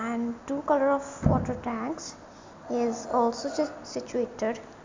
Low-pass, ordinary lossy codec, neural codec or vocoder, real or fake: 7.2 kHz; MP3, 64 kbps; none; real